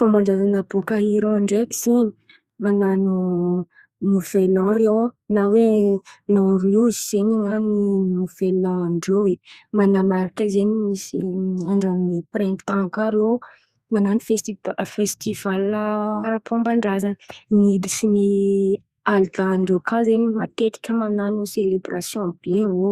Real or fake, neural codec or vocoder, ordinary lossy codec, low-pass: fake; codec, 32 kHz, 1.9 kbps, SNAC; Opus, 64 kbps; 14.4 kHz